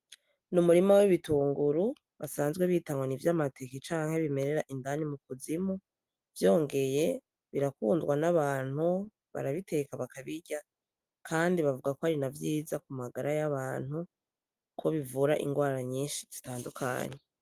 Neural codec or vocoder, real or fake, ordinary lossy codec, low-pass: none; real; Opus, 32 kbps; 14.4 kHz